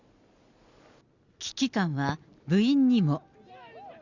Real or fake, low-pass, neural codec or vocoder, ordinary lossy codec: real; 7.2 kHz; none; Opus, 64 kbps